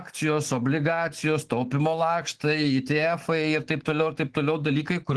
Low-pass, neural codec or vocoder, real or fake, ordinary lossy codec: 10.8 kHz; autoencoder, 48 kHz, 128 numbers a frame, DAC-VAE, trained on Japanese speech; fake; Opus, 16 kbps